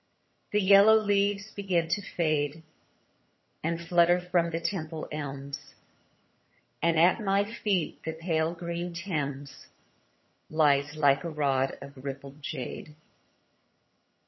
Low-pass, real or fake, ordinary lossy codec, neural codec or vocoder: 7.2 kHz; fake; MP3, 24 kbps; vocoder, 22.05 kHz, 80 mel bands, HiFi-GAN